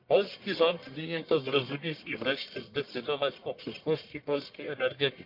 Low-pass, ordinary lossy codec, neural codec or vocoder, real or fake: 5.4 kHz; MP3, 48 kbps; codec, 44.1 kHz, 1.7 kbps, Pupu-Codec; fake